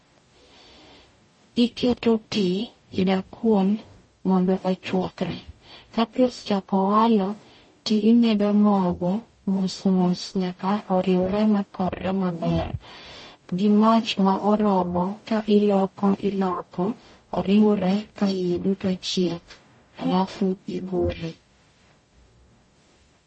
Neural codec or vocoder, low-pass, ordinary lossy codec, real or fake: codec, 44.1 kHz, 0.9 kbps, DAC; 10.8 kHz; MP3, 32 kbps; fake